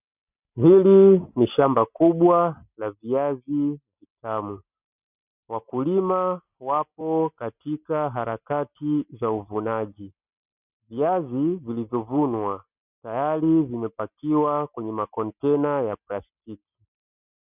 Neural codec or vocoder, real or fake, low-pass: none; real; 3.6 kHz